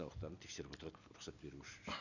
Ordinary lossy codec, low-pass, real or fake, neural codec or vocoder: none; 7.2 kHz; real; none